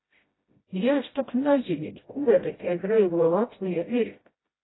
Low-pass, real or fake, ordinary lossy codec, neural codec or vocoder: 7.2 kHz; fake; AAC, 16 kbps; codec, 16 kHz, 0.5 kbps, FreqCodec, smaller model